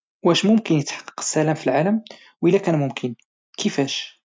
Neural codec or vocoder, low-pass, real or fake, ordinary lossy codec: none; none; real; none